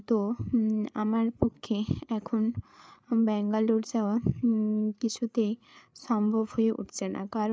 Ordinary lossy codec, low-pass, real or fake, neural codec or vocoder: none; none; fake; codec, 16 kHz, 8 kbps, FreqCodec, larger model